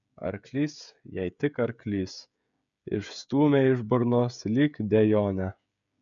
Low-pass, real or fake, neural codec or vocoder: 7.2 kHz; fake; codec, 16 kHz, 16 kbps, FreqCodec, smaller model